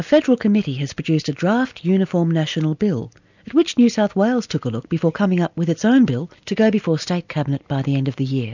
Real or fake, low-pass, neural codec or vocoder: real; 7.2 kHz; none